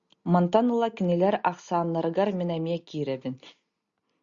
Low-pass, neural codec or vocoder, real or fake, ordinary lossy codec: 7.2 kHz; none; real; Opus, 64 kbps